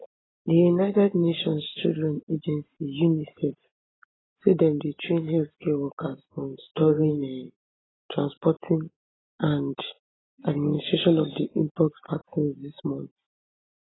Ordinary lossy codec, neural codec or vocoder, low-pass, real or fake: AAC, 16 kbps; none; 7.2 kHz; real